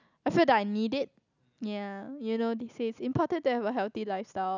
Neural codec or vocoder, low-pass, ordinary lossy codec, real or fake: none; 7.2 kHz; none; real